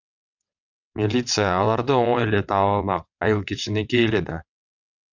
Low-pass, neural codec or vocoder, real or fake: 7.2 kHz; vocoder, 22.05 kHz, 80 mel bands, WaveNeXt; fake